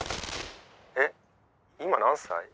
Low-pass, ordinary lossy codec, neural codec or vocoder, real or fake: none; none; none; real